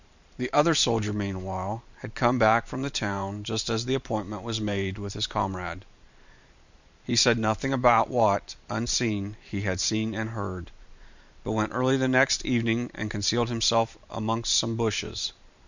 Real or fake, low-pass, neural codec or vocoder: fake; 7.2 kHz; vocoder, 44.1 kHz, 128 mel bands every 512 samples, BigVGAN v2